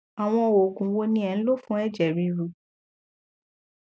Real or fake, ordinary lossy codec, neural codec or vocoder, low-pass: real; none; none; none